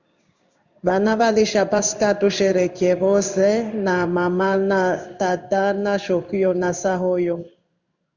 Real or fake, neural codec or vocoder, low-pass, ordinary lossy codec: fake; codec, 16 kHz in and 24 kHz out, 1 kbps, XY-Tokenizer; 7.2 kHz; Opus, 64 kbps